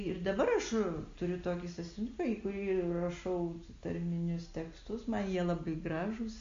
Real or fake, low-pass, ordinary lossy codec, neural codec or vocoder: real; 7.2 kHz; MP3, 48 kbps; none